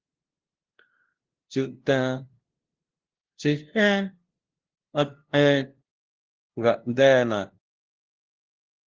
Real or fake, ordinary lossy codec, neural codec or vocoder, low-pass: fake; Opus, 16 kbps; codec, 16 kHz, 0.5 kbps, FunCodec, trained on LibriTTS, 25 frames a second; 7.2 kHz